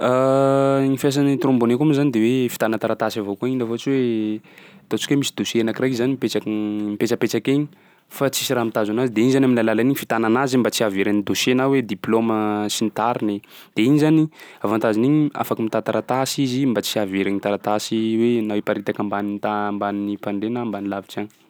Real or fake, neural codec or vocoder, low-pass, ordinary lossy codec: real; none; none; none